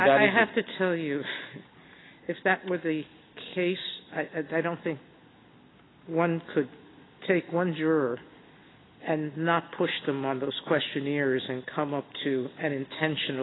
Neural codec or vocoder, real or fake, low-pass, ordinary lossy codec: none; real; 7.2 kHz; AAC, 16 kbps